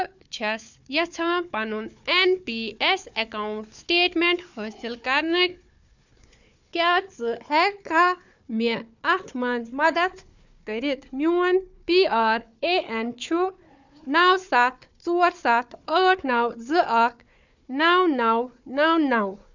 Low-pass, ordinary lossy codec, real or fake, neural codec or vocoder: 7.2 kHz; none; fake; codec, 16 kHz, 4 kbps, FunCodec, trained on Chinese and English, 50 frames a second